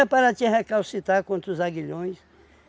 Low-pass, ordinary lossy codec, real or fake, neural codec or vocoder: none; none; real; none